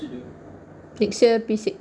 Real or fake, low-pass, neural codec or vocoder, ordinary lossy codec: real; 9.9 kHz; none; none